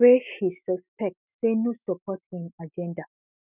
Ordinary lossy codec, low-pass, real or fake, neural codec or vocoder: none; 3.6 kHz; real; none